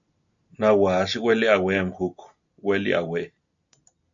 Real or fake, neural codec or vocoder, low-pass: real; none; 7.2 kHz